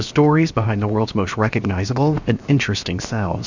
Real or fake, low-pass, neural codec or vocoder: fake; 7.2 kHz; codec, 16 kHz, 0.7 kbps, FocalCodec